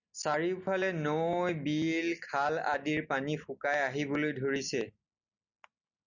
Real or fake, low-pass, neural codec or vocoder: real; 7.2 kHz; none